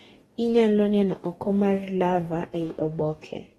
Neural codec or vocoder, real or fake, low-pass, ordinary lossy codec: codec, 44.1 kHz, 2.6 kbps, DAC; fake; 19.8 kHz; AAC, 32 kbps